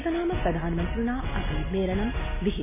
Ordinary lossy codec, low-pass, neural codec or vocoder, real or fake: MP3, 16 kbps; 3.6 kHz; none; real